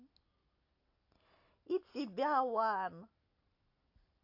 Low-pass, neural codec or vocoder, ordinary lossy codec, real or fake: 5.4 kHz; none; AAC, 32 kbps; real